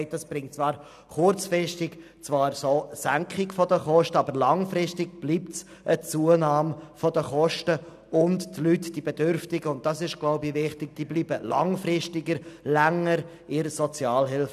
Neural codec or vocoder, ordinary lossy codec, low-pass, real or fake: none; none; 14.4 kHz; real